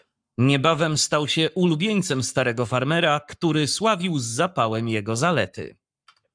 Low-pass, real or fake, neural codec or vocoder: 9.9 kHz; fake; codec, 44.1 kHz, 7.8 kbps, Pupu-Codec